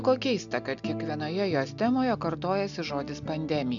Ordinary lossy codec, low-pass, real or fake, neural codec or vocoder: MP3, 96 kbps; 7.2 kHz; real; none